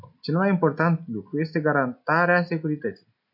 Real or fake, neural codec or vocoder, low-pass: real; none; 5.4 kHz